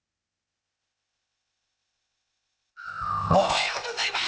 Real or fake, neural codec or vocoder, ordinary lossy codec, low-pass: fake; codec, 16 kHz, 0.8 kbps, ZipCodec; none; none